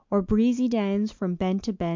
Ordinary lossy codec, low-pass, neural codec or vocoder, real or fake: MP3, 64 kbps; 7.2 kHz; none; real